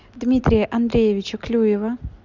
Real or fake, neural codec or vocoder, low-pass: real; none; 7.2 kHz